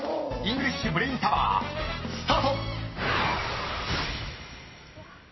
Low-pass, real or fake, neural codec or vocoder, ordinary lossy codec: 7.2 kHz; real; none; MP3, 24 kbps